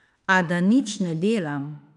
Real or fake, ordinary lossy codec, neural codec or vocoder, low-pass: fake; none; autoencoder, 48 kHz, 32 numbers a frame, DAC-VAE, trained on Japanese speech; 10.8 kHz